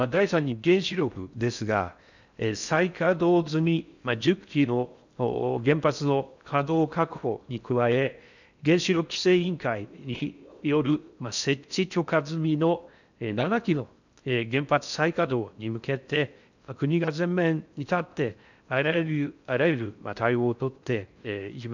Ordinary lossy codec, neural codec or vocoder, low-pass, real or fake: none; codec, 16 kHz in and 24 kHz out, 0.6 kbps, FocalCodec, streaming, 4096 codes; 7.2 kHz; fake